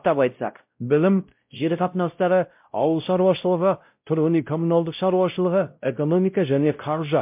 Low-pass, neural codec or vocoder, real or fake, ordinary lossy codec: 3.6 kHz; codec, 16 kHz, 0.5 kbps, X-Codec, WavLM features, trained on Multilingual LibriSpeech; fake; MP3, 32 kbps